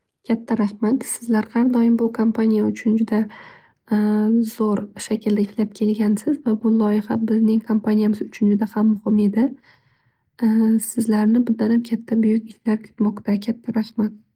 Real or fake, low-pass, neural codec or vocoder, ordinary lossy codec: real; 19.8 kHz; none; Opus, 24 kbps